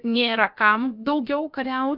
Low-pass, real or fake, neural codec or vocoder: 5.4 kHz; fake; codec, 16 kHz, about 1 kbps, DyCAST, with the encoder's durations